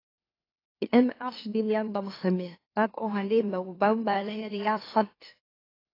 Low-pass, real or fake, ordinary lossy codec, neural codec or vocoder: 5.4 kHz; fake; AAC, 24 kbps; autoencoder, 44.1 kHz, a latent of 192 numbers a frame, MeloTTS